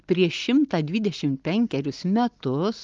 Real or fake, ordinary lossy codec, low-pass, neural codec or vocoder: real; Opus, 32 kbps; 7.2 kHz; none